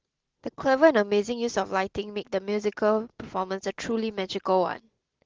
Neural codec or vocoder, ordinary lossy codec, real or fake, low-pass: none; Opus, 16 kbps; real; 7.2 kHz